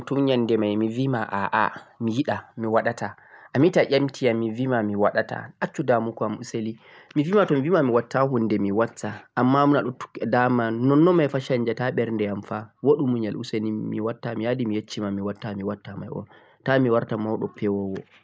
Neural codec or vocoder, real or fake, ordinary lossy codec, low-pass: none; real; none; none